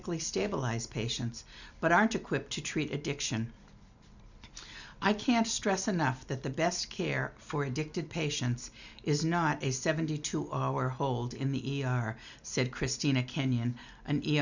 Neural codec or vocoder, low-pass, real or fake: none; 7.2 kHz; real